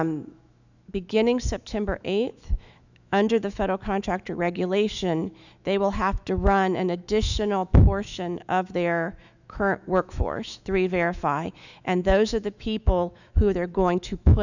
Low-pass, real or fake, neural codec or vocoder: 7.2 kHz; fake; autoencoder, 48 kHz, 128 numbers a frame, DAC-VAE, trained on Japanese speech